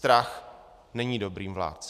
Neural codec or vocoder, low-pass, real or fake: none; 14.4 kHz; real